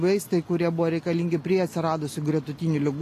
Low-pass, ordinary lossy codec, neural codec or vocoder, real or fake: 14.4 kHz; AAC, 48 kbps; none; real